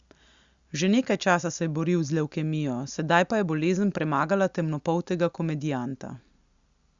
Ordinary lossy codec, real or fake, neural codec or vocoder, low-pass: Opus, 64 kbps; real; none; 7.2 kHz